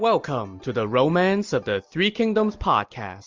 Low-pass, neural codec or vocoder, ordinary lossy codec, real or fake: 7.2 kHz; none; Opus, 32 kbps; real